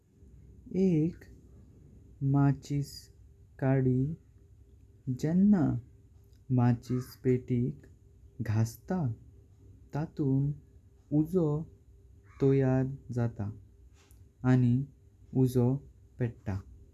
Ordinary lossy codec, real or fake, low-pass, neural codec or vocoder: none; real; 14.4 kHz; none